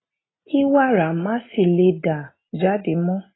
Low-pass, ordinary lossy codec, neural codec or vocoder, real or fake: 7.2 kHz; AAC, 16 kbps; none; real